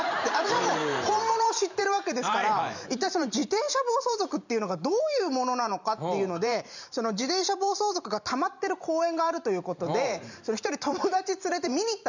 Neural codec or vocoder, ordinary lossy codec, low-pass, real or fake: vocoder, 44.1 kHz, 128 mel bands every 512 samples, BigVGAN v2; none; 7.2 kHz; fake